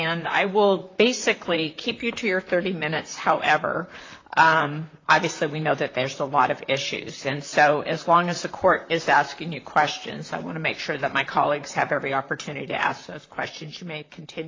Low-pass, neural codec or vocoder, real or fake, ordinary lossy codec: 7.2 kHz; vocoder, 44.1 kHz, 128 mel bands, Pupu-Vocoder; fake; AAC, 32 kbps